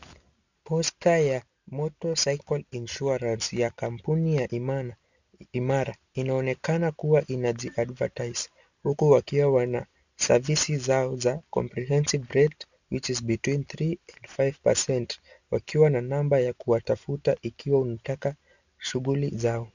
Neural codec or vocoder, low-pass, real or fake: none; 7.2 kHz; real